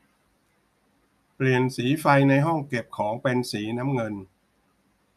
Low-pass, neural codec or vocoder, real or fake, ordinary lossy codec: 14.4 kHz; none; real; none